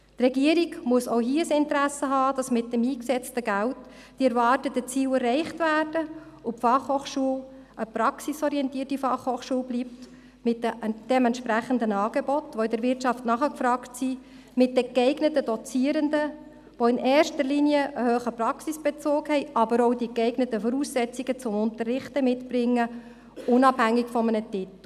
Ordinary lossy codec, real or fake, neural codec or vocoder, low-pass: none; real; none; 14.4 kHz